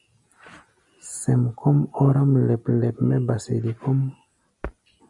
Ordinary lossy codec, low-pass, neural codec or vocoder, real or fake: MP3, 96 kbps; 10.8 kHz; none; real